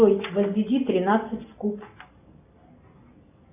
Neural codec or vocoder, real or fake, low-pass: none; real; 3.6 kHz